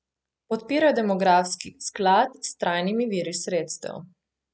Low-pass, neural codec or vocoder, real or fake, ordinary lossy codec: none; none; real; none